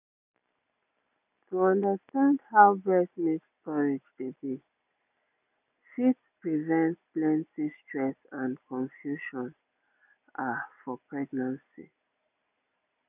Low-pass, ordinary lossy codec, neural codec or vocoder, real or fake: 3.6 kHz; none; none; real